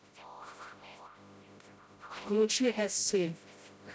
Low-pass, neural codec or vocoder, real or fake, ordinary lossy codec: none; codec, 16 kHz, 0.5 kbps, FreqCodec, smaller model; fake; none